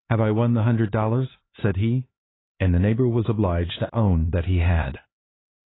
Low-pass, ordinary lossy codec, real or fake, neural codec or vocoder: 7.2 kHz; AAC, 16 kbps; fake; codec, 16 kHz, 4 kbps, X-Codec, HuBERT features, trained on LibriSpeech